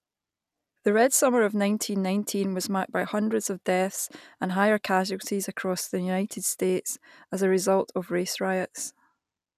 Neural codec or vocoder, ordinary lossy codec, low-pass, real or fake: none; none; 14.4 kHz; real